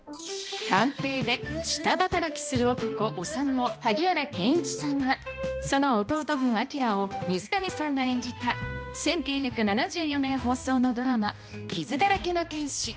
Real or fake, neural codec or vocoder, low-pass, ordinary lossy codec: fake; codec, 16 kHz, 1 kbps, X-Codec, HuBERT features, trained on balanced general audio; none; none